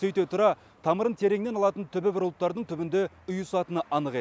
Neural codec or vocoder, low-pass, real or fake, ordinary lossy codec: none; none; real; none